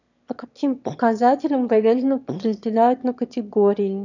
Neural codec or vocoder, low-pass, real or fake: autoencoder, 22.05 kHz, a latent of 192 numbers a frame, VITS, trained on one speaker; 7.2 kHz; fake